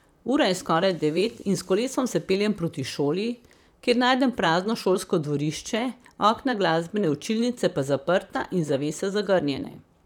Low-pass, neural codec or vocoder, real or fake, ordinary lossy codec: 19.8 kHz; vocoder, 44.1 kHz, 128 mel bands, Pupu-Vocoder; fake; none